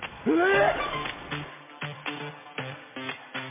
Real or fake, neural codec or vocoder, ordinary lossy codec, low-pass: real; none; MP3, 16 kbps; 3.6 kHz